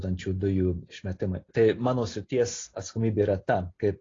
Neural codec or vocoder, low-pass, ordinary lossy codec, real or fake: none; 7.2 kHz; AAC, 32 kbps; real